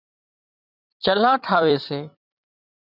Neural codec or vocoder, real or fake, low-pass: vocoder, 44.1 kHz, 128 mel bands every 256 samples, BigVGAN v2; fake; 5.4 kHz